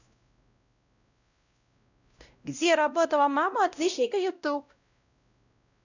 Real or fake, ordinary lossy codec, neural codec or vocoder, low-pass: fake; none; codec, 16 kHz, 0.5 kbps, X-Codec, WavLM features, trained on Multilingual LibriSpeech; 7.2 kHz